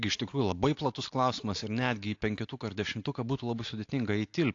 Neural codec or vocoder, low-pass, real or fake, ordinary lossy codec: none; 7.2 kHz; real; AAC, 48 kbps